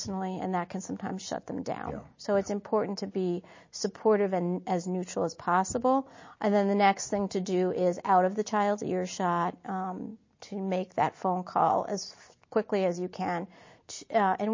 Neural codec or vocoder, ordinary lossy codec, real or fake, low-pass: none; MP3, 32 kbps; real; 7.2 kHz